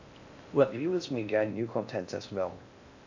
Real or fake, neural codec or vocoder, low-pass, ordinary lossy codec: fake; codec, 16 kHz in and 24 kHz out, 0.6 kbps, FocalCodec, streaming, 4096 codes; 7.2 kHz; AAC, 48 kbps